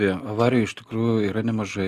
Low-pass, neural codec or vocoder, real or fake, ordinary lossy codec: 14.4 kHz; none; real; Opus, 32 kbps